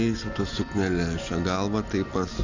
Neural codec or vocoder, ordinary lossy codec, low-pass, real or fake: none; Opus, 64 kbps; 7.2 kHz; real